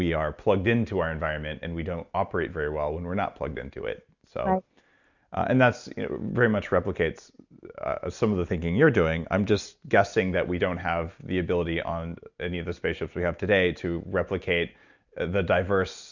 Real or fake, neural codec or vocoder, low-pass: real; none; 7.2 kHz